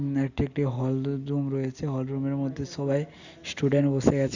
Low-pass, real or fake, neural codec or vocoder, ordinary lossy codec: 7.2 kHz; real; none; Opus, 64 kbps